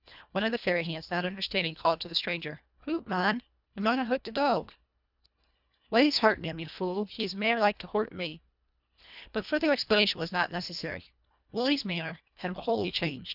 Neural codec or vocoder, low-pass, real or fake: codec, 24 kHz, 1.5 kbps, HILCodec; 5.4 kHz; fake